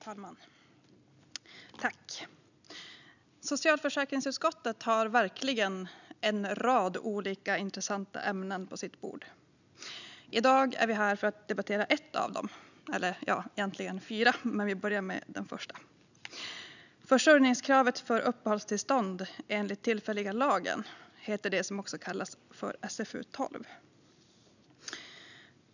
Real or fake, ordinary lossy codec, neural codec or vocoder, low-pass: real; none; none; 7.2 kHz